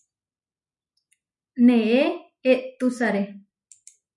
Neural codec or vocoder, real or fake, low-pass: none; real; 10.8 kHz